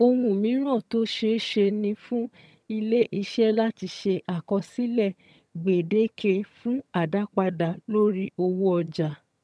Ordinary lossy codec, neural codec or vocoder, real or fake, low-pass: none; vocoder, 22.05 kHz, 80 mel bands, HiFi-GAN; fake; none